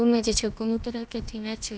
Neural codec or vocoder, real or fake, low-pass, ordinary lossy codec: codec, 16 kHz, about 1 kbps, DyCAST, with the encoder's durations; fake; none; none